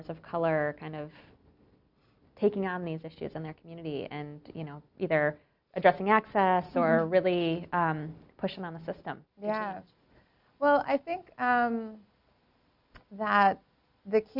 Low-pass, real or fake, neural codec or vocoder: 5.4 kHz; real; none